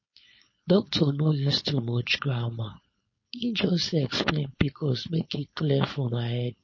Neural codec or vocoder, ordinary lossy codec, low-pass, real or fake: codec, 16 kHz, 4.8 kbps, FACodec; MP3, 32 kbps; 7.2 kHz; fake